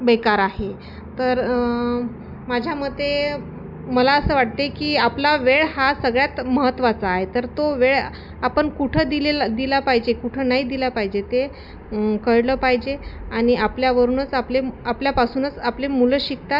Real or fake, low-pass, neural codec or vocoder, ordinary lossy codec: real; 5.4 kHz; none; none